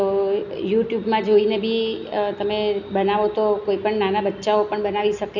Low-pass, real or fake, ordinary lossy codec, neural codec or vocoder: 7.2 kHz; real; none; none